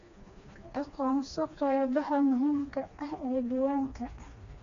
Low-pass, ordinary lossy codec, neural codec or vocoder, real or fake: 7.2 kHz; AAC, 48 kbps; codec, 16 kHz, 2 kbps, FreqCodec, smaller model; fake